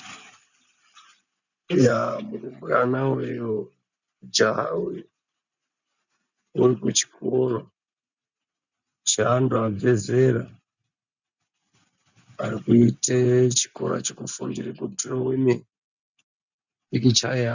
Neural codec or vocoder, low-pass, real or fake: none; 7.2 kHz; real